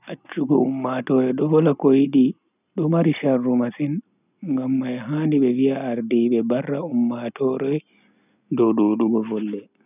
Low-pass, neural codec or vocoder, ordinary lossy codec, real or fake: 3.6 kHz; none; none; real